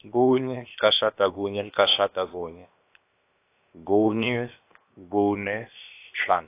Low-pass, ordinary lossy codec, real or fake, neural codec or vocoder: 3.6 kHz; AAC, 24 kbps; fake; codec, 16 kHz, 0.7 kbps, FocalCodec